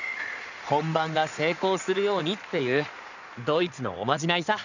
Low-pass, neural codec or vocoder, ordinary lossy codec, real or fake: 7.2 kHz; codec, 16 kHz in and 24 kHz out, 2.2 kbps, FireRedTTS-2 codec; none; fake